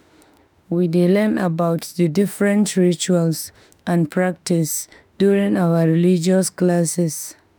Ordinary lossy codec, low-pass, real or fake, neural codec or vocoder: none; none; fake; autoencoder, 48 kHz, 32 numbers a frame, DAC-VAE, trained on Japanese speech